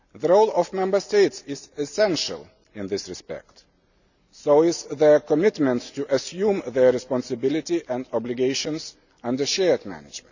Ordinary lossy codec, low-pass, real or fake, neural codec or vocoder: none; 7.2 kHz; real; none